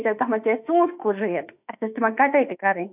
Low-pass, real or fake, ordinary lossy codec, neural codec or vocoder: 3.6 kHz; fake; none; autoencoder, 48 kHz, 32 numbers a frame, DAC-VAE, trained on Japanese speech